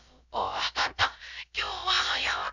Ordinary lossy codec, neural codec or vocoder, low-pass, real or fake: none; codec, 16 kHz, about 1 kbps, DyCAST, with the encoder's durations; 7.2 kHz; fake